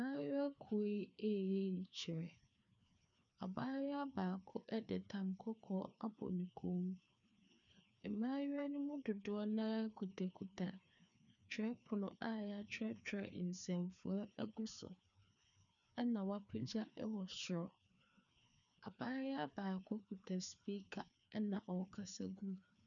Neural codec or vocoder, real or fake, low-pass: codec, 16 kHz, 2 kbps, FreqCodec, larger model; fake; 7.2 kHz